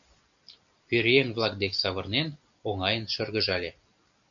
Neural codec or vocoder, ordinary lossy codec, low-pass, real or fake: none; MP3, 96 kbps; 7.2 kHz; real